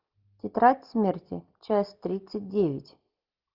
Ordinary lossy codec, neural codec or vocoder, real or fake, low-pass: Opus, 24 kbps; none; real; 5.4 kHz